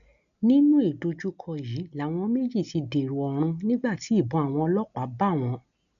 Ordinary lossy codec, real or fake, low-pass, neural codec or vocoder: none; real; 7.2 kHz; none